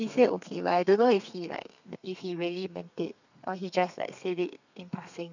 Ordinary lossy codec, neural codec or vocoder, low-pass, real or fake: none; codec, 32 kHz, 1.9 kbps, SNAC; 7.2 kHz; fake